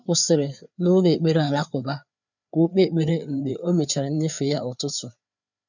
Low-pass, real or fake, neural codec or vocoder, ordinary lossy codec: 7.2 kHz; fake; codec, 16 kHz, 4 kbps, FreqCodec, larger model; none